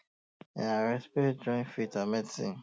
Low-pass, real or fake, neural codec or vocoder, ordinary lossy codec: none; real; none; none